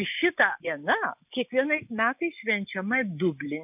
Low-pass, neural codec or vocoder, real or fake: 3.6 kHz; none; real